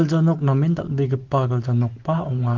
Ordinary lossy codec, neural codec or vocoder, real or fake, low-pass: Opus, 16 kbps; none; real; 7.2 kHz